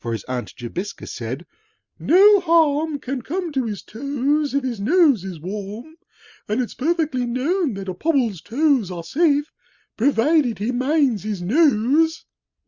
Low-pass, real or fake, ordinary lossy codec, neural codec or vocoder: 7.2 kHz; real; Opus, 64 kbps; none